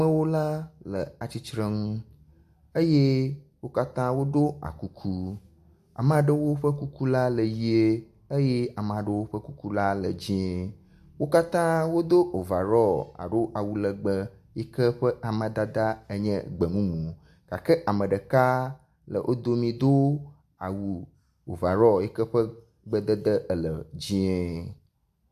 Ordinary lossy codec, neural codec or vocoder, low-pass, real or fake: AAC, 96 kbps; none; 14.4 kHz; real